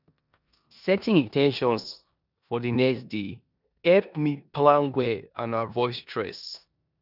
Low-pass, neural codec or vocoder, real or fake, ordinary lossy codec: 5.4 kHz; codec, 16 kHz in and 24 kHz out, 0.9 kbps, LongCat-Audio-Codec, four codebook decoder; fake; none